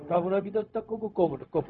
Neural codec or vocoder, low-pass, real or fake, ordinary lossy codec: codec, 16 kHz, 0.4 kbps, LongCat-Audio-Codec; 7.2 kHz; fake; none